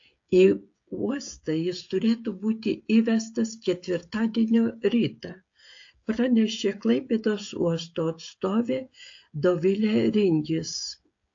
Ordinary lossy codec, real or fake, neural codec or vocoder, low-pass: AAC, 48 kbps; fake; codec, 16 kHz, 16 kbps, FreqCodec, smaller model; 7.2 kHz